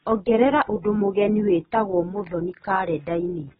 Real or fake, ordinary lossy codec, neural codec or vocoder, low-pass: real; AAC, 16 kbps; none; 10.8 kHz